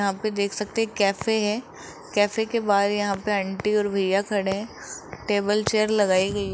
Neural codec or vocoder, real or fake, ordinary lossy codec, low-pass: none; real; none; none